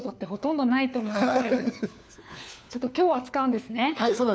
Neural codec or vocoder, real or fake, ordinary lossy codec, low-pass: codec, 16 kHz, 4 kbps, FunCodec, trained on LibriTTS, 50 frames a second; fake; none; none